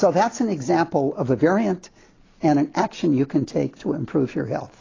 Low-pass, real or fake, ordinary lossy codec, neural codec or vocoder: 7.2 kHz; real; AAC, 32 kbps; none